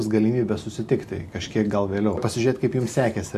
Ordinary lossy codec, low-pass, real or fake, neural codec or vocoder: MP3, 64 kbps; 14.4 kHz; real; none